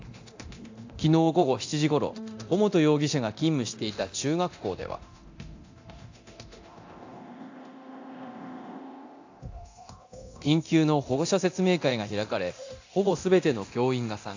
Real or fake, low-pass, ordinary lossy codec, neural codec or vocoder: fake; 7.2 kHz; AAC, 48 kbps; codec, 24 kHz, 0.9 kbps, DualCodec